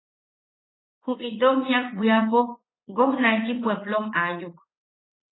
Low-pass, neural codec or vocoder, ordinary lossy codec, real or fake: 7.2 kHz; vocoder, 44.1 kHz, 80 mel bands, Vocos; AAC, 16 kbps; fake